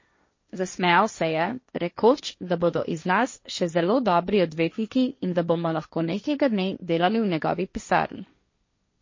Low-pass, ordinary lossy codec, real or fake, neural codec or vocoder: 7.2 kHz; MP3, 32 kbps; fake; codec, 16 kHz, 1.1 kbps, Voila-Tokenizer